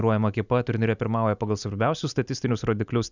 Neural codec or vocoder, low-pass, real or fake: none; 7.2 kHz; real